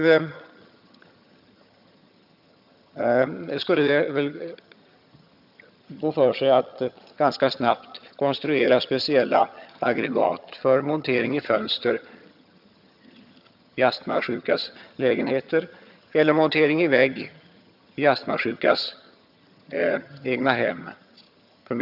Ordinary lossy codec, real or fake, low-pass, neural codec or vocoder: none; fake; 5.4 kHz; vocoder, 22.05 kHz, 80 mel bands, HiFi-GAN